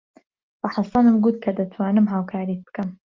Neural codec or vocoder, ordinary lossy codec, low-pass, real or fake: none; Opus, 32 kbps; 7.2 kHz; real